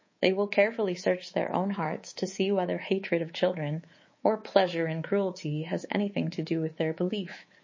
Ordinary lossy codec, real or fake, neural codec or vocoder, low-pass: MP3, 32 kbps; fake; codec, 24 kHz, 3.1 kbps, DualCodec; 7.2 kHz